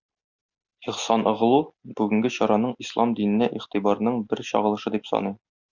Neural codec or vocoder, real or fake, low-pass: none; real; 7.2 kHz